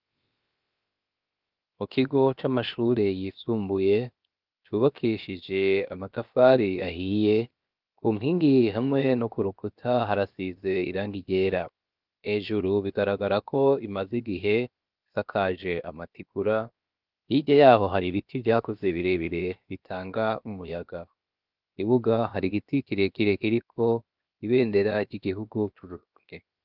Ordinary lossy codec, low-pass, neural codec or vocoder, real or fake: Opus, 24 kbps; 5.4 kHz; codec, 16 kHz, 0.7 kbps, FocalCodec; fake